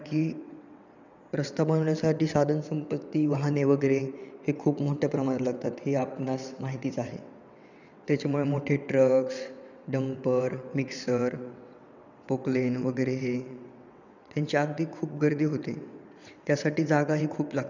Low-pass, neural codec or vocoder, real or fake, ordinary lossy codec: 7.2 kHz; vocoder, 22.05 kHz, 80 mel bands, WaveNeXt; fake; none